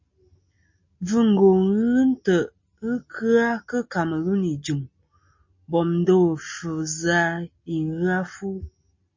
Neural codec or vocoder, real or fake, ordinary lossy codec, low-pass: none; real; MP3, 32 kbps; 7.2 kHz